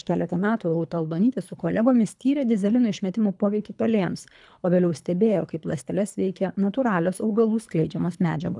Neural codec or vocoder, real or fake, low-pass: codec, 24 kHz, 3 kbps, HILCodec; fake; 10.8 kHz